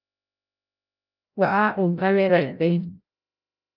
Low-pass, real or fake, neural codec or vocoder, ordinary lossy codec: 5.4 kHz; fake; codec, 16 kHz, 0.5 kbps, FreqCodec, larger model; Opus, 24 kbps